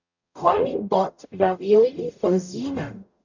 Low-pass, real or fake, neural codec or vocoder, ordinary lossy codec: 7.2 kHz; fake; codec, 44.1 kHz, 0.9 kbps, DAC; none